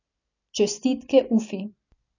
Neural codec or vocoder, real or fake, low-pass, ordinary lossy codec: none; real; 7.2 kHz; none